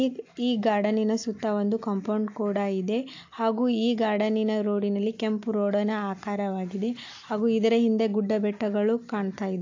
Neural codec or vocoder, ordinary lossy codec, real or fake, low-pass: none; AAC, 48 kbps; real; 7.2 kHz